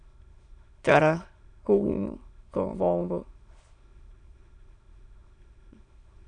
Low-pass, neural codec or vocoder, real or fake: 9.9 kHz; autoencoder, 22.05 kHz, a latent of 192 numbers a frame, VITS, trained on many speakers; fake